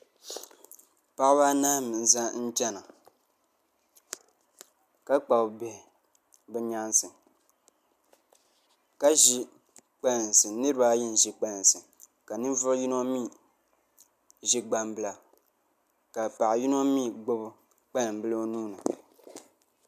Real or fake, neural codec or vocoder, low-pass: real; none; 14.4 kHz